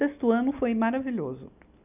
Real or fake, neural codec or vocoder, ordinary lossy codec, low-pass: real; none; none; 3.6 kHz